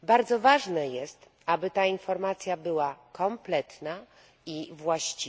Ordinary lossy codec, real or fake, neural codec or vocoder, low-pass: none; real; none; none